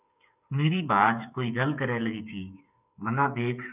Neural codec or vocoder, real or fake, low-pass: codec, 16 kHz, 8 kbps, FreqCodec, smaller model; fake; 3.6 kHz